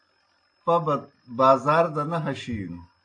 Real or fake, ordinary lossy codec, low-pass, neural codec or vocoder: real; AAC, 48 kbps; 9.9 kHz; none